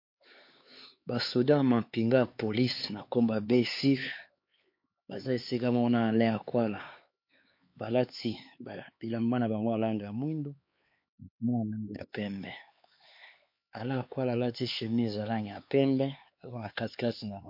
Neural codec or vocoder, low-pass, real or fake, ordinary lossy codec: codec, 16 kHz, 4 kbps, X-Codec, HuBERT features, trained on LibriSpeech; 5.4 kHz; fake; MP3, 32 kbps